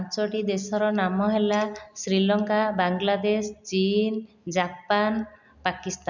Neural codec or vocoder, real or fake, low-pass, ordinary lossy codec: none; real; 7.2 kHz; none